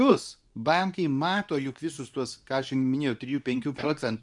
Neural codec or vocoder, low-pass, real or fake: codec, 24 kHz, 0.9 kbps, WavTokenizer, medium speech release version 2; 10.8 kHz; fake